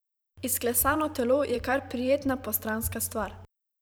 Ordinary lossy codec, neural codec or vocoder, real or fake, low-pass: none; vocoder, 44.1 kHz, 128 mel bands every 512 samples, BigVGAN v2; fake; none